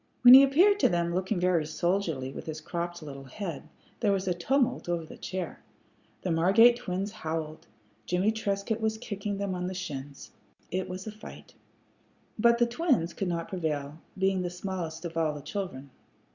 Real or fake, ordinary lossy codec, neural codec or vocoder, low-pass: real; Opus, 64 kbps; none; 7.2 kHz